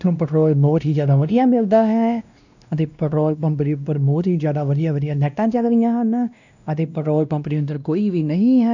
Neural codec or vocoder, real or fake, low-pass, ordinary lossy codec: codec, 16 kHz, 1 kbps, X-Codec, WavLM features, trained on Multilingual LibriSpeech; fake; 7.2 kHz; none